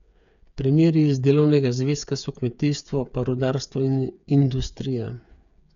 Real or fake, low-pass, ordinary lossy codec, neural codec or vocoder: fake; 7.2 kHz; none; codec, 16 kHz, 8 kbps, FreqCodec, smaller model